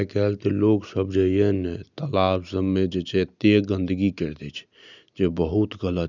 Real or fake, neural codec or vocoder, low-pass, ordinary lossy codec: real; none; 7.2 kHz; none